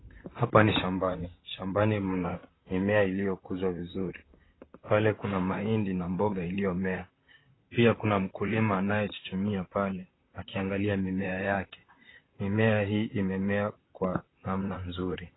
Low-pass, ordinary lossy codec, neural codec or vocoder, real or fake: 7.2 kHz; AAC, 16 kbps; vocoder, 44.1 kHz, 128 mel bands, Pupu-Vocoder; fake